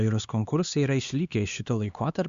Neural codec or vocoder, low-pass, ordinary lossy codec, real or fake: codec, 16 kHz, 2 kbps, X-Codec, HuBERT features, trained on LibriSpeech; 7.2 kHz; Opus, 64 kbps; fake